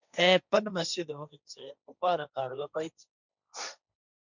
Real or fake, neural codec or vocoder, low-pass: fake; codec, 16 kHz, 1.1 kbps, Voila-Tokenizer; 7.2 kHz